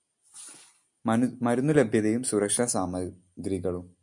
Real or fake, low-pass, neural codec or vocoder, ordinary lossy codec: real; 10.8 kHz; none; MP3, 96 kbps